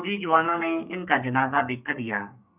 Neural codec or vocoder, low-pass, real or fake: codec, 32 kHz, 1.9 kbps, SNAC; 3.6 kHz; fake